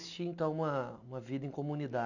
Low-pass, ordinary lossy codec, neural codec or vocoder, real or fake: 7.2 kHz; none; none; real